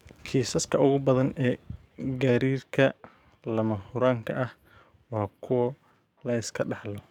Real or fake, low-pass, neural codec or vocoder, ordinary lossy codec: fake; 19.8 kHz; codec, 44.1 kHz, 7.8 kbps, DAC; none